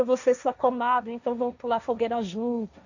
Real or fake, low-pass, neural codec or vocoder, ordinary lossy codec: fake; 7.2 kHz; codec, 16 kHz, 1.1 kbps, Voila-Tokenizer; none